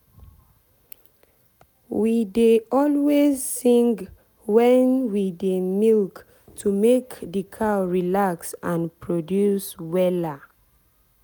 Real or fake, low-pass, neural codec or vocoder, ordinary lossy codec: real; none; none; none